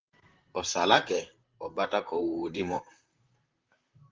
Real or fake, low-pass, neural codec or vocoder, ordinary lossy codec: fake; 7.2 kHz; vocoder, 44.1 kHz, 128 mel bands, Pupu-Vocoder; Opus, 32 kbps